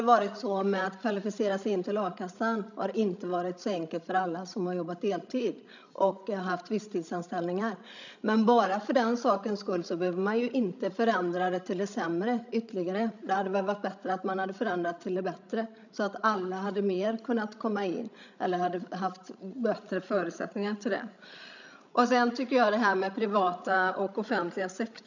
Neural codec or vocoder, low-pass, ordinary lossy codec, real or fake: codec, 16 kHz, 16 kbps, FreqCodec, larger model; 7.2 kHz; none; fake